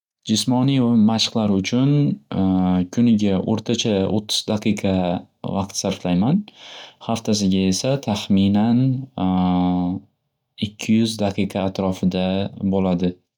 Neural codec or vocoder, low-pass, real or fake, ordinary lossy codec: none; 19.8 kHz; real; none